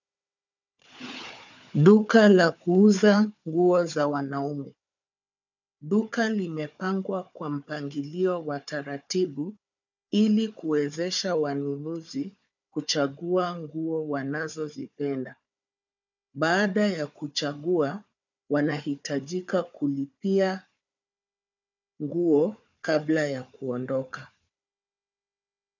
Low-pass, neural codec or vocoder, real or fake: 7.2 kHz; codec, 16 kHz, 4 kbps, FunCodec, trained on Chinese and English, 50 frames a second; fake